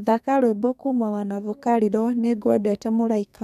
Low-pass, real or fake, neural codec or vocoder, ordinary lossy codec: 14.4 kHz; fake; codec, 32 kHz, 1.9 kbps, SNAC; none